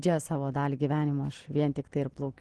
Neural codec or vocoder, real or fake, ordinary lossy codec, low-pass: none; real; Opus, 16 kbps; 10.8 kHz